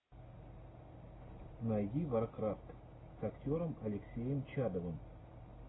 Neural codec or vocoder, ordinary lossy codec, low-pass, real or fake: none; AAC, 16 kbps; 7.2 kHz; real